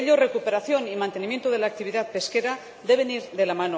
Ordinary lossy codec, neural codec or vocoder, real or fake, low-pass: none; none; real; none